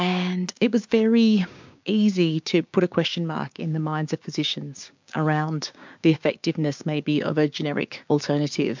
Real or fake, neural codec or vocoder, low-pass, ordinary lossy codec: fake; codec, 16 kHz, 6 kbps, DAC; 7.2 kHz; MP3, 64 kbps